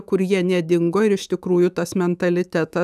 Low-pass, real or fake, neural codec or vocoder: 14.4 kHz; fake; autoencoder, 48 kHz, 128 numbers a frame, DAC-VAE, trained on Japanese speech